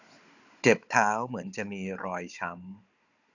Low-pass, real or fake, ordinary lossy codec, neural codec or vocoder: 7.2 kHz; fake; none; codec, 16 kHz, 16 kbps, FunCodec, trained on Chinese and English, 50 frames a second